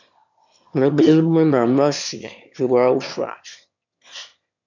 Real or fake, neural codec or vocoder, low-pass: fake; autoencoder, 22.05 kHz, a latent of 192 numbers a frame, VITS, trained on one speaker; 7.2 kHz